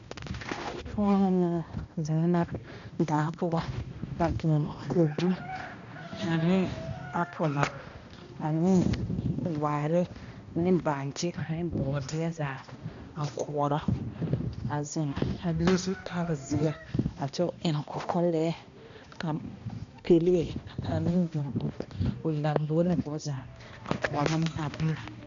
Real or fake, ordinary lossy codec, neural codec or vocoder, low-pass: fake; AAC, 64 kbps; codec, 16 kHz, 1 kbps, X-Codec, HuBERT features, trained on balanced general audio; 7.2 kHz